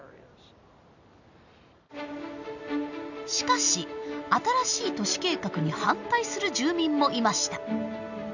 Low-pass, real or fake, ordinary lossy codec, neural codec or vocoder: 7.2 kHz; real; none; none